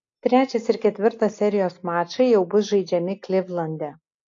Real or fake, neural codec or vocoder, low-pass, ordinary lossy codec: real; none; 7.2 kHz; AAC, 48 kbps